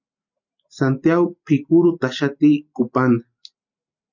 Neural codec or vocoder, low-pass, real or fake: none; 7.2 kHz; real